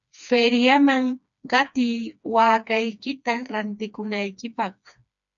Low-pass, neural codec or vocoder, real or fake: 7.2 kHz; codec, 16 kHz, 2 kbps, FreqCodec, smaller model; fake